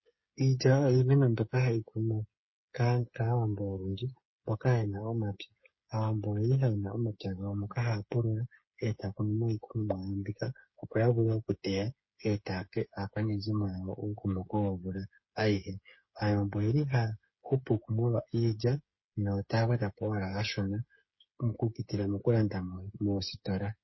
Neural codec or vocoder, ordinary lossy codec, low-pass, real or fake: codec, 16 kHz, 8 kbps, FreqCodec, smaller model; MP3, 24 kbps; 7.2 kHz; fake